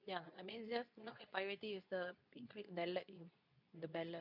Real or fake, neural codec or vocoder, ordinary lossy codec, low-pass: fake; codec, 24 kHz, 0.9 kbps, WavTokenizer, medium speech release version 2; none; 5.4 kHz